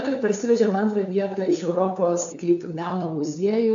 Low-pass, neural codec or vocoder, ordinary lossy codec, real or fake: 7.2 kHz; codec, 16 kHz, 2 kbps, FunCodec, trained on LibriTTS, 25 frames a second; AAC, 64 kbps; fake